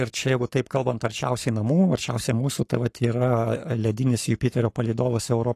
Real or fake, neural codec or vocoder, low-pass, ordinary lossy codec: fake; codec, 44.1 kHz, 7.8 kbps, Pupu-Codec; 14.4 kHz; AAC, 48 kbps